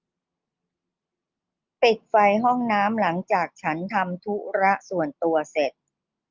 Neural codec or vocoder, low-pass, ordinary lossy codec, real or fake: none; 7.2 kHz; Opus, 24 kbps; real